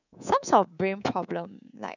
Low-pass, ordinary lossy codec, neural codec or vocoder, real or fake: 7.2 kHz; none; codec, 24 kHz, 3.1 kbps, DualCodec; fake